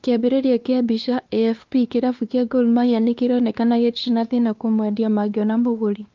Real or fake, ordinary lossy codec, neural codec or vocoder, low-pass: fake; Opus, 32 kbps; codec, 16 kHz, 2 kbps, X-Codec, WavLM features, trained on Multilingual LibriSpeech; 7.2 kHz